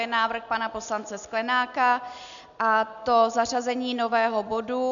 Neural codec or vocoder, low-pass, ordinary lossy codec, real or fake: none; 7.2 kHz; MP3, 96 kbps; real